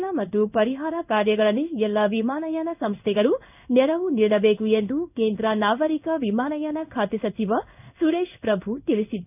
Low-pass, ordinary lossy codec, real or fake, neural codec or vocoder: 3.6 kHz; none; fake; codec, 16 kHz in and 24 kHz out, 1 kbps, XY-Tokenizer